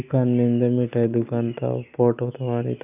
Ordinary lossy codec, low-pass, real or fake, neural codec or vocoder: none; 3.6 kHz; real; none